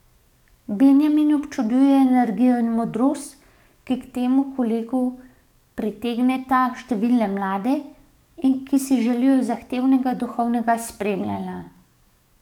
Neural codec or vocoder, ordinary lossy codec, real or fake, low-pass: codec, 44.1 kHz, 7.8 kbps, DAC; none; fake; 19.8 kHz